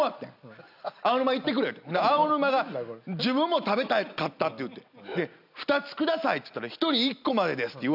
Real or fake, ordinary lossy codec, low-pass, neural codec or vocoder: real; none; 5.4 kHz; none